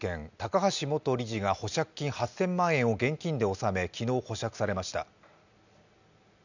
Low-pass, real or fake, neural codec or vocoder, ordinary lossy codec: 7.2 kHz; real; none; none